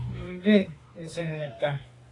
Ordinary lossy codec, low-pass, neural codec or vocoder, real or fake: AAC, 32 kbps; 10.8 kHz; autoencoder, 48 kHz, 32 numbers a frame, DAC-VAE, trained on Japanese speech; fake